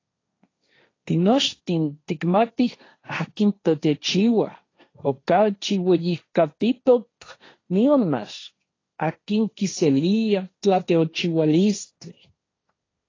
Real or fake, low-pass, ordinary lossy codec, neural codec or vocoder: fake; 7.2 kHz; AAC, 32 kbps; codec, 16 kHz, 1.1 kbps, Voila-Tokenizer